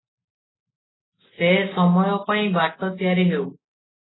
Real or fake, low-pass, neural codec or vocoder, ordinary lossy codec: real; 7.2 kHz; none; AAC, 16 kbps